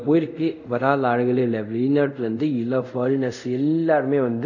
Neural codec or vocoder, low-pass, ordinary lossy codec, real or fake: codec, 24 kHz, 0.5 kbps, DualCodec; 7.2 kHz; none; fake